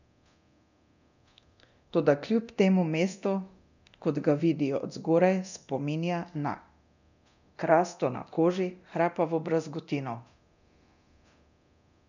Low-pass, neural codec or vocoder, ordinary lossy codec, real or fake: 7.2 kHz; codec, 24 kHz, 0.9 kbps, DualCodec; none; fake